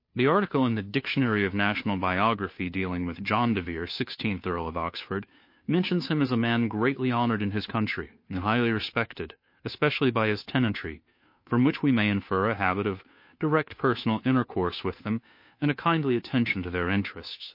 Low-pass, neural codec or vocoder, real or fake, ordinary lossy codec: 5.4 kHz; codec, 16 kHz, 2 kbps, FunCodec, trained on Chinese and English, 25 frames a second; fake; MP3, 32 kbps